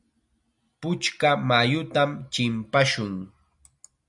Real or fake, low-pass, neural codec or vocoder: real; 10.8 kHz; none